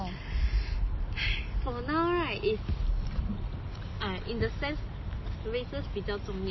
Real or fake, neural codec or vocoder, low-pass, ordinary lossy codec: real; none; 7.2 kHz; MP3, 24 kbps